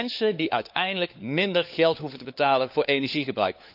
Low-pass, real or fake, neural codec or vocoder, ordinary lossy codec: 5.4 kHz; fake; codec, 16 kHz, 4 kbps, FunCodec, trained on LibriTTS, 50 frames a second; none